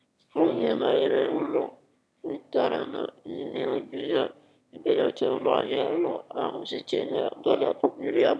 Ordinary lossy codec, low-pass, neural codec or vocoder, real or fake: none; none; autoencoder, 22.05 kHz, a latent of 192 numbers a frame, VITS, trained on one speaker; fake